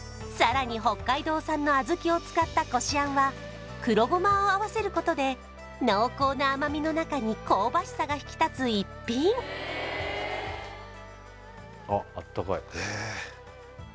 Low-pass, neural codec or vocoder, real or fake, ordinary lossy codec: none; none; real; none